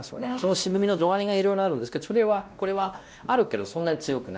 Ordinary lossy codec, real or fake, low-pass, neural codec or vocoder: none; fake; none; codec, 16 kHz, 1 kbps, X-Codec, WavLM features, trained on Multilingual LibriSpeech